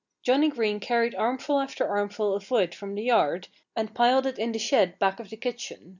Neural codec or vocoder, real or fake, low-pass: none; real; 7.2 kHz